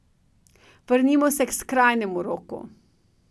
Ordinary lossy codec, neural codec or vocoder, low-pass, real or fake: none; none; none; real